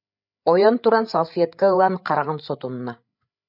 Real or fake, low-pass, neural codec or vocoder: fake; 5.4 kHz; codec, 16 kHz, 8 kbps, FreqCodec, larger model